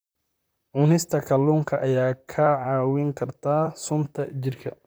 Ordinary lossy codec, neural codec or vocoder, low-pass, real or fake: none; vocoder, 44.1 kHz, 128 mel bands, Pupu-Vocoder; none; fake